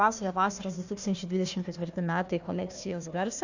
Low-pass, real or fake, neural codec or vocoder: 7.2 kHz; fake; codec, 16 kHz, 1 kbps, FunCodec, trained on Chinese and English, 50 frames a second